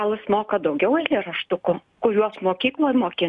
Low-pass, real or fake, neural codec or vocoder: 10.8 kHz; real; none